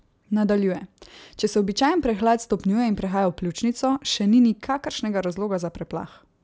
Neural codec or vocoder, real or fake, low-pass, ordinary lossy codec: none; real; none; none